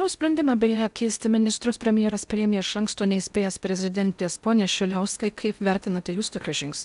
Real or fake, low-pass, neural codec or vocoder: fake; 10.8 kHz; codec, 16 kHz in and 24 kHz out, 0.8 kbps, FocalCodec, streaming, 65536 codes